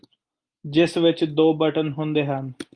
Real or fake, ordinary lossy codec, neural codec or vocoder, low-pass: real; Opus, 32 kbps; none; 9.9 kHz